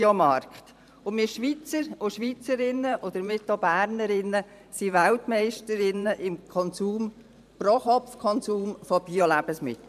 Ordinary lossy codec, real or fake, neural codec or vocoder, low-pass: MP3, 96 kbps; fake; vocoder, 44.1 kHz, 128 mel bands every 512 samples, BigVGAN v2; 14.4 kHz